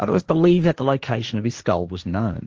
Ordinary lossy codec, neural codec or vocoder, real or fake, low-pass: Opus, 24 kbps; codec, 16 kHz, 1.1 kbps, Voila-Tokenizer; fake; 7.2 kHz